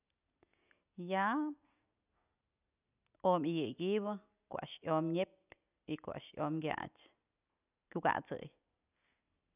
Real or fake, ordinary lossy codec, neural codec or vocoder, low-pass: real; none; none; 3.6 kHz